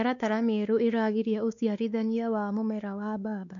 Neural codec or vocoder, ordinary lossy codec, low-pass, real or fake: codec, 16 kHz, 2 kbps, X-Codec, WavLM features, trained on Multilingual LibriSpeech; none; 7.2 kHz; fake